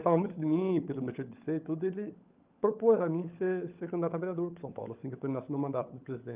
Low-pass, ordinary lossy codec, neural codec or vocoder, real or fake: 3.6 kHz; Opus, 24 kbps; codec, 16 kHz, 16 kbps, FunCodec, trained on LibriTTS, 50 frames a second; fake